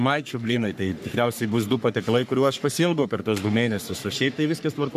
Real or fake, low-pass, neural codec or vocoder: fake; 14.4 kHz; codec, 44.1 kHz, 3.4 kbps, Pupu-Codec